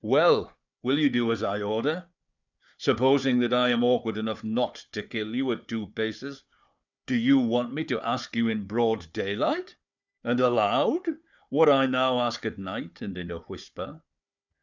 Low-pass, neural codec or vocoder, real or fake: 7.2 kHz; codec, 16 kHz, 4 kbps, FunCodec, trained on Chinese and English, 50 frames a second; fake